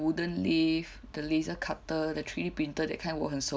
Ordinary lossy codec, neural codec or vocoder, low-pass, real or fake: none; none; none; real